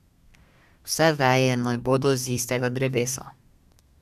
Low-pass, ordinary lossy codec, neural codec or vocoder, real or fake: 14.4 kHz; none; codec, 32 kHz, 1.9 kbps, SNAC; fake